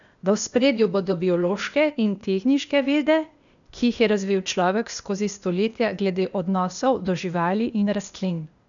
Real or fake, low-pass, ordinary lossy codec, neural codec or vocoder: fake; 7.2 kHz; none; codec, 16 kHz, 0.8 kbps, ZipCodec